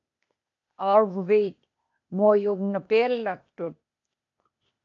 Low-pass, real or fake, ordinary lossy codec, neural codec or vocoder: 7.2 kHz; fake; AAC, 48 kbps; codec, 16 kHz, 0.8 kbps, ZipCodec